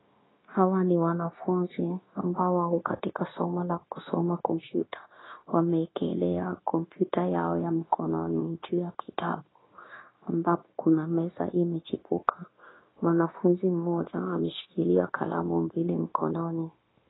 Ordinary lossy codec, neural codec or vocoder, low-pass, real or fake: AAC, 16 kbps; codec, 16 kHz, 0.9 kbps, LongCat-Audio-Codec; 7.2 kHz; fake